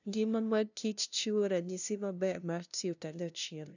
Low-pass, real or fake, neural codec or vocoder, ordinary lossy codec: 7.2 kHz; fake; codec, 16 kHz, 0.5 kbps, FunCodec, trained on LibriTTS, 25 frames a second; none